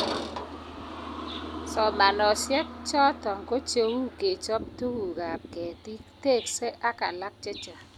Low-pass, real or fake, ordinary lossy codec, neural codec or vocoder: 19.8 kHz; real; none; none